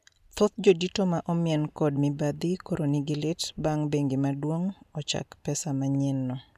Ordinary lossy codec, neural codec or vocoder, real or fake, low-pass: none; none; real; 14.4 kHz